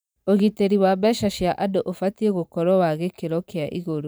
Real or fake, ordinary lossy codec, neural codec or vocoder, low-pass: fake; none; vocoder, 44.1 kHz, 128 mel bands every 512 samples, BigVGAN v2; none